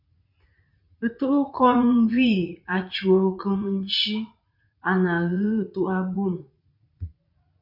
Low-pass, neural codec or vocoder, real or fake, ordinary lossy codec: 5.4 kHz; vocoder, 44.1 kHz, 80 mel bands, Vocos; fake; MP3, 48 kbps